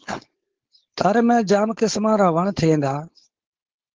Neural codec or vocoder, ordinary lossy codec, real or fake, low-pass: codec, 16 kHz, 4.8 kbps, FACodec; Opus, 16 kbps; fake; 7.2 kHz